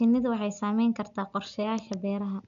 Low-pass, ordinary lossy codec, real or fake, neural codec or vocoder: 7.2 kHz; none; real; none